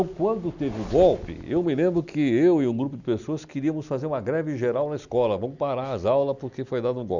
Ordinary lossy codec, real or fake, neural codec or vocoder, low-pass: none; real; none; 7.2 kHz